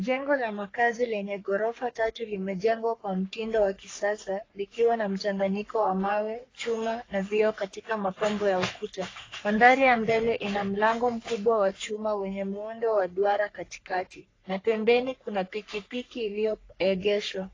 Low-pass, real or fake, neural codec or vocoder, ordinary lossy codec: 7.2 kHz; fake; codec, 44.1 kHz, 3.4 kbps, Pupu-Codec; AAC, 32 kbps